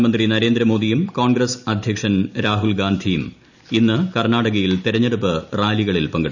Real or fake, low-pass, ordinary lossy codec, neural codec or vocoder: real; 7.2 kHz; none; none